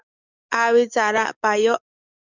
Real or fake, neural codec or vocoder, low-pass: fake; codec, 44.1 kHz, 7.8 kbps, DAC; 7.2 kHz